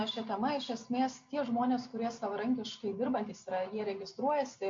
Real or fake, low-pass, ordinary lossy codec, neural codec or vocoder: real; 7.2 kHz; MP3, 48 kbps; none